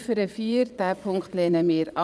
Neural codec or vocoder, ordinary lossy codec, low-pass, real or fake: vocoder, 22.05 kHz, 80 mel bands, Vocos; none; none; fake